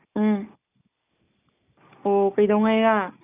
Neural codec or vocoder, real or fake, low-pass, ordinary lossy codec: none; real; 3.6 kHz; none